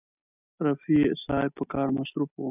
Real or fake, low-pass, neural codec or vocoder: real; 3.6 kHz; none